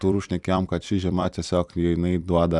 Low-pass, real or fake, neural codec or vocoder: 10.8 kHz; fake; vocoder, 44.1 kHz, 128 mel bands every 256 samples, BigVGAN v2